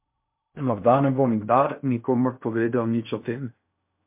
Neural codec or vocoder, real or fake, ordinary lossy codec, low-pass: codec, 16 kHz in and 24 kHz out, 0.6 kbps, FocalCodec, streaming, 4096 codes; fake; MP3, 32 kbps; 3.6 kHz